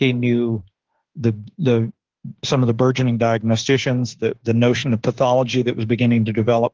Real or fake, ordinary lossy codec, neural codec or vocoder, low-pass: fake; Opus, 16 kbps; autoencoder, 48 kHz, 32 numbers a frame, DAC-VAE, trained on Japanese speech; 7.2 kHz